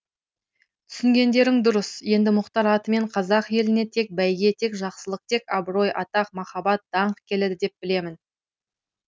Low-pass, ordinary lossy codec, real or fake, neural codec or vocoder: none; none; real; none